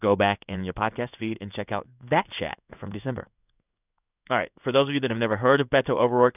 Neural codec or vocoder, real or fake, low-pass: codec, 16 kHz in and 24 kHz out, 1 kbps, XY-Tokenizer; fake; 3.6 kHz